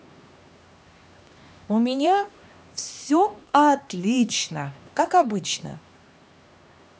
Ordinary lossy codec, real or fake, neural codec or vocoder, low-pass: none; fake; codec, 16 kHz, 2 kbps, X-Codec, HuBERT features, trained on LibriSpeech; none